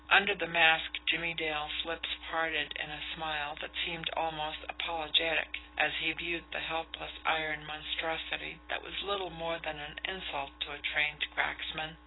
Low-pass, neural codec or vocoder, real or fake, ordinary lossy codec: 7.2 kHz; none; real; AAC, 16 kbps